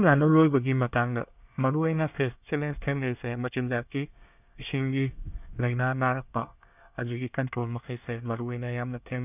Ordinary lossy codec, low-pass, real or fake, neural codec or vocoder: none; 3.6 kHz; fake; codec, 24 kHz, 1 kbps, SNAC